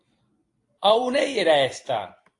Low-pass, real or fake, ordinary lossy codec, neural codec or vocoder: 10.8 kHz; fake; AAC, 48 kbps; vocoder, 44.1 kHz, 128 mel bands every 256 samples, BigVGAN v2